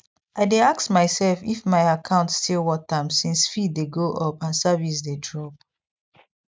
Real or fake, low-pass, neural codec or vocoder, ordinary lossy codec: real; none; none; none